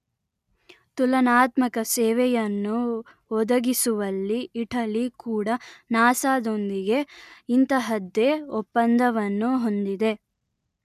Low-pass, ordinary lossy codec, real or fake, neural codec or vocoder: 14.4 kHz; none; real; none